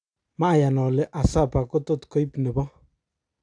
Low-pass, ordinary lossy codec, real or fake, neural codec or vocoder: 9.9 kHz; AAC, 64 kbps; real; none